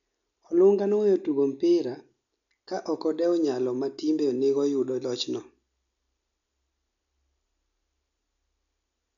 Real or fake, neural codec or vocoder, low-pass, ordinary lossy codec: real; none; 7.2 kHz; none